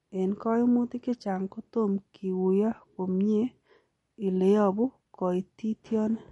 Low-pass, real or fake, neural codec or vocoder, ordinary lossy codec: 19.8 kHz; real; none; MP3, 48 kbps